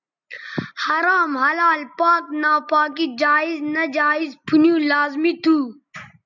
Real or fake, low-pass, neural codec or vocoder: real; 7.2 kHz; none